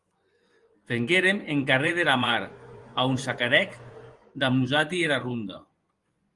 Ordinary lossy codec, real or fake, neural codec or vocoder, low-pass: Opus, 24 kbps; fake; vocoder, 24 kHz, 100 mel bands, Vocos; 10.8 kHz